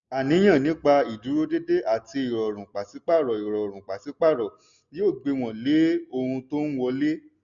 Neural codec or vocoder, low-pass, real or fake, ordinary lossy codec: none; 7.2 kHz; real; none